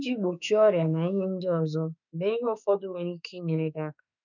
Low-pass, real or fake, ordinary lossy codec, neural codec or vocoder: 7.2 kHz; fake; none; autoencoder, 48 kHz, 32 numbers a frame, DAC-VAE, trained on Japanese speech